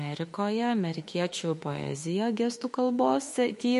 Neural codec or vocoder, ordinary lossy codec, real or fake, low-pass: autoencoder, 48 kHz, 32 numbers a frame, DAC-VAE, trained on Japanese speech; MP3, 48 kbps; fake; 14.4 kHz